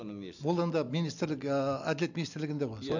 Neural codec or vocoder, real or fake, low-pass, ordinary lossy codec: none; real; 7.2 kHz; none